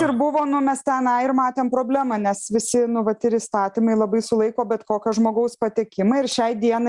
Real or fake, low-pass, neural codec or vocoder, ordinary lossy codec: real; 10.8 kHz; none; Opus, 64 kbps